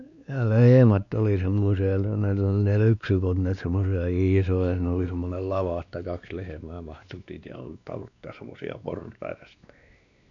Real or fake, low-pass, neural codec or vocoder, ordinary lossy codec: fake; 7.2 kHz; codec, 16 kHz, 2 kbps, X-Codec, WavLM features, trained on Multilingual LibriSpeech; none